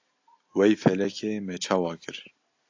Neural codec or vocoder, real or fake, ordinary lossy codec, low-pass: none; real; AAC, 48 kbps; 7.2 kHz